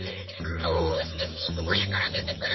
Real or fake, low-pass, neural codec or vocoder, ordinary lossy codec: fake; 7.2 kHz; codec, 16 kHz, 4.8 kbps, FACodec; MP3, 24 kbps